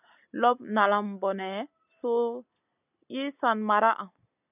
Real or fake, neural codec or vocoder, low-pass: real; none; 3.6 kHz